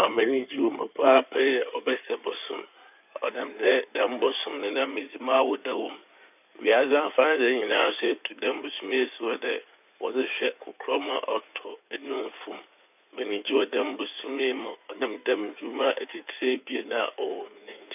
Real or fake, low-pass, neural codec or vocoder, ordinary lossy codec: fake; 3.6 kHz; codec, 16 kHz in and 24 kHz out, 2.2 kbps, FireRedTTS-2 codec; none